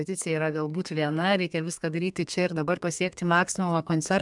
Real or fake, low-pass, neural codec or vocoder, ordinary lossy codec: fake; 10.8 kHz; codec, 32 kHz, 1.9 kbps, SNAC; MP3, 96 kbps